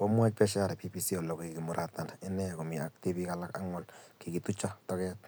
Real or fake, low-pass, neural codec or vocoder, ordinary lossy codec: real; none; none; none